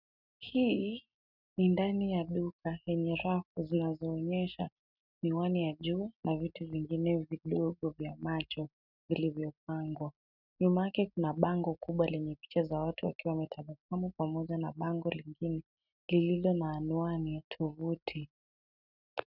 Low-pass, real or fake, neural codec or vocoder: 5.4 kHz; real; none